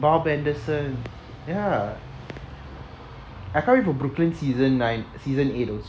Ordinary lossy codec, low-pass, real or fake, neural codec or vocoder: none; none; real; none